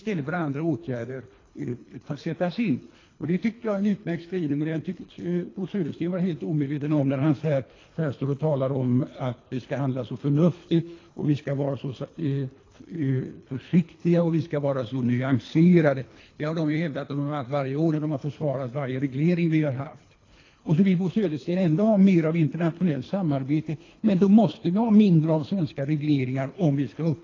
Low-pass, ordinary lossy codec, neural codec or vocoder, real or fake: 7.2 kHz; AAC, 32 kbps; codec, 24 kHz, 3 kbps, HILCodec; fake